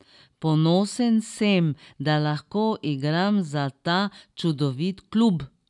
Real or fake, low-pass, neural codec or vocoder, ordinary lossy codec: real; 10.8 kHz; none; none